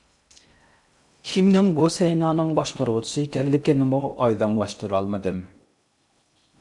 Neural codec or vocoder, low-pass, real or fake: codec, 16 kHz in and 24 kHz out, 0.6 kbps, FocalCodec, streaming, 2048 codes; 10.8 kHz; fake